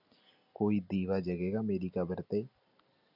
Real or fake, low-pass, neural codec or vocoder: real; 5.4 kHz; none